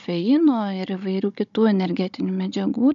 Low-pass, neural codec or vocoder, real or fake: 7.2 kHz; codec, 16 kHz, 16 kbps, FunCodec, trained on Chinese and English, 50 frames a second; fake